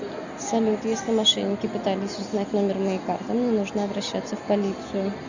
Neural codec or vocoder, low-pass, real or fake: none; 7.2 kHz; real